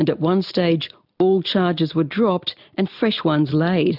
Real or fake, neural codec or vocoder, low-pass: real; none; 5.4 kHz